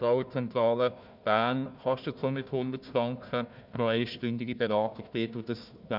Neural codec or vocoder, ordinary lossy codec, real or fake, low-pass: codec, 16 kHz, 1 kbps, FunCodec, trained on Chinese and English, 50 frames a second; none; fake; 5.4 kHz